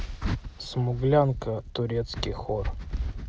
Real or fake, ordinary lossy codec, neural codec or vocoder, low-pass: real; none; none; none